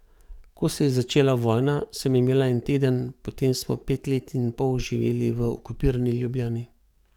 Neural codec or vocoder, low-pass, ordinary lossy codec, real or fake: codec, 44.1 kHz, 7.8 kbps, DAC; 19.8 kHz; none; fake